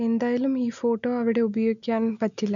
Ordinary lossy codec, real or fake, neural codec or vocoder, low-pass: none; real; none; 7.2 kHz